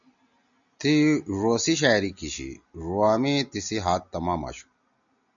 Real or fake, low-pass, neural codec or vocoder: real; 7.2 kHz; none